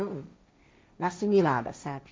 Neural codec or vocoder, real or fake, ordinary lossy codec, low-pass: codec, 16 kHz, 1.1 kbps, Voila-Tokenizer; fake; none; none